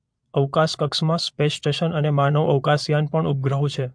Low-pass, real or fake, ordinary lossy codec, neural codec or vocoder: 9.9 kHz; fake; MP3, 64 kbps; vocoder, 22.05 kHz, 80 mel bands, Vocos